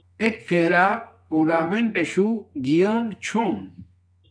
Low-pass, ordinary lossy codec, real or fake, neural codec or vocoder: 9.9 kHz; AAC, 64 kbps; fake; codec, 24 kHz, 0.9 kbps, WavTokenizer, medium music audio release